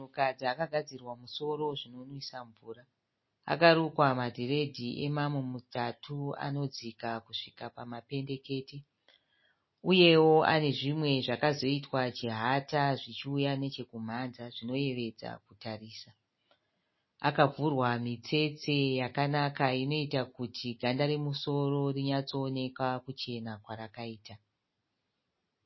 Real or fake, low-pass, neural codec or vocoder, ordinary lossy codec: real; 7.2 kHz; none; MP3, 24 kbps